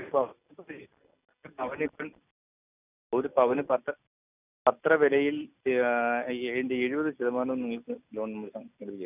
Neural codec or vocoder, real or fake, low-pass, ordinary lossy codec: none; real; 3.6 kHz; none